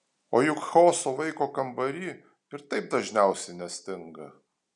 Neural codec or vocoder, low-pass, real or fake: none; 10.8 kHz; real